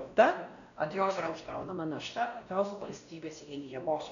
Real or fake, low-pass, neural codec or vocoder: fake; 7.2 kHz; codec, 16 kHz, 1 kbps, X-Codec, WavLM features, trained on Multilingual LibriSpeech